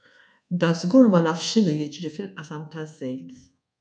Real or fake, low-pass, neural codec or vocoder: fake; 9.9 kHz; codec, 24 kHz, 1.2 kbps, DualCodec